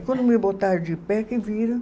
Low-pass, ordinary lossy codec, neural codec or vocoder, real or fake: none; none; none; real